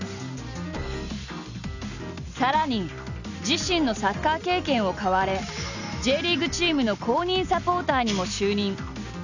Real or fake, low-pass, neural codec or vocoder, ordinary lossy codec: real; 7.2 kHz; none; none